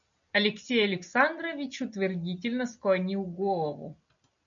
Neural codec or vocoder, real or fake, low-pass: none; real; 7.2 kHz